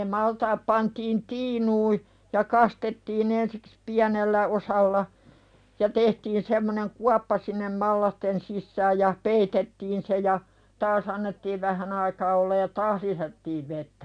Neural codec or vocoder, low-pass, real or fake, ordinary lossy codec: none; 9.9 kHz; real; none